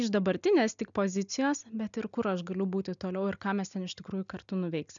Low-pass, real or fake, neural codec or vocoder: 7.2 kHz; real; none